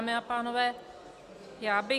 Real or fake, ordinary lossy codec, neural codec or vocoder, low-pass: real; Opus, 64 kbps; none; 14.4 kHz